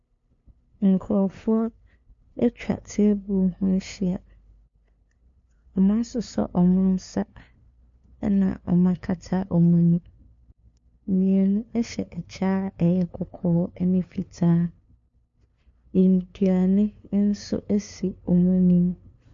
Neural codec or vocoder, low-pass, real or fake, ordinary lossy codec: codec, 16 kHz, 2 kbps, FunCodec, trained on LibriTTS, 25 frames a second; 7.2 kHz; fake; MP3, 48 kbps